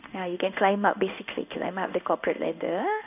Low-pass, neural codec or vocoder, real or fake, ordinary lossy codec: 3.6 kHz; codec, 16 kHz in and 24 kHz out, 1 kbps, XY-Tokenizer; fake; none